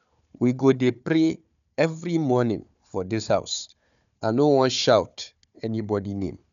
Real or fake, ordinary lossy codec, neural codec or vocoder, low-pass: fake; none; codec, 16 kHz, 4 kbps, FunCodec, trained on Chinese and English, 50 frames a second; 7.2 kHz